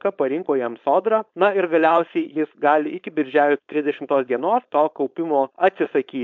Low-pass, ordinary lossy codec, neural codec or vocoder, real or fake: 7.2 kHz; MP3, 64 kbps; codec, 16 kHz, 4.8 kbps, FACodec; fake